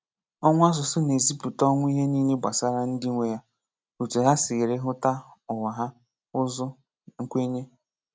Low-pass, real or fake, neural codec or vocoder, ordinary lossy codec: none; real; none; none